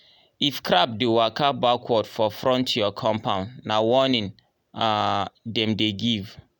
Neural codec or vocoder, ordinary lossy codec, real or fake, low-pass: none; none; real; none